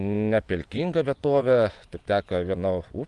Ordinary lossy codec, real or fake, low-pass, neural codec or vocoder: Opus, 32 kbps; fake; 10.8 kHz; vocoder, 24 kHz, 100 mel bands, Vocos